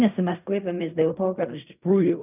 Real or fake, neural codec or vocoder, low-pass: fake; codec, 16 kHz in and 24 kHz out, 0.4 kbps, LongCat-Audio-Codec, fine tuned four codebook decoder; 3.6 kHz